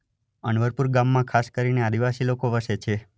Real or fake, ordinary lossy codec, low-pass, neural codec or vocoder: real; none; none; none